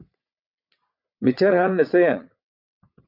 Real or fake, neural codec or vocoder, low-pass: fake; vocoder, 22.05 kHz, 80 mel bands, WaveNeXt; 5.4 kHz